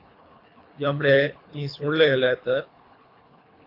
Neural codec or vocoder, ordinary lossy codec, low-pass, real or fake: codec, 24 kHz, 3 kbps, HILCodec; AAC, 32 kbps; 5.4 kHz; fake